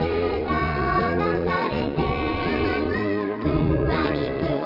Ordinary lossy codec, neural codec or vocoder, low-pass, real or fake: none; vocoder, 22.05 kHz, 80 mel bands, Vocos; 5.4 kHz; fake